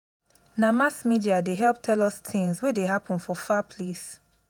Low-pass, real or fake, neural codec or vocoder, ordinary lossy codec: none; fake; vocoder, 48 kHz, 128 mel bands, Vocos; none